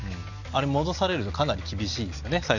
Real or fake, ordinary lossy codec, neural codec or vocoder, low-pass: real; none; none; 7.2 kHz